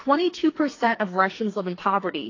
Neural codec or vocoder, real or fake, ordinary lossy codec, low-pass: codec, 44.1 kHz, 2.6 kbps, SNAC; fake; AAC, 32 kbps; 7.2 kHz